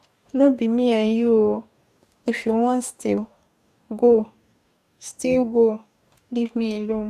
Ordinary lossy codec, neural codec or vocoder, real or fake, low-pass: none; codec, 44.1 kHz, 2.6 kbps, DAC; fake; 14.4 kHz